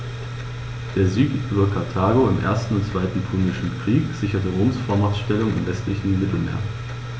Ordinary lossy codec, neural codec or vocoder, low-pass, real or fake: none; none; none; real